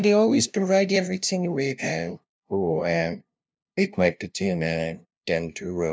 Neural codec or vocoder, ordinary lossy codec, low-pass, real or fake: codec, 16 kHz, 0.5 kbps, FunCodec, trained on LibriTTS, 25 frames a second; none; none; fake